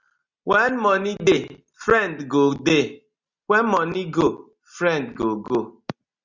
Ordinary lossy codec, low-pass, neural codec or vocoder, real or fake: Opus, 64 kbps; 7.2 kHz; none; real